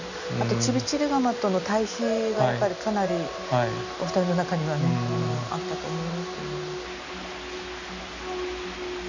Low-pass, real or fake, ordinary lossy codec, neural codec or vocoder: 7.2 kHz; real; none; none